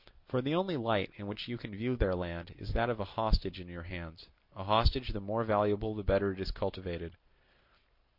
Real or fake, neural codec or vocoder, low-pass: real; none; 5.4 kHz